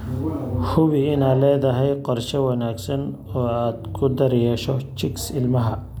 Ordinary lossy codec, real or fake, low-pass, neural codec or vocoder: none; real; none; none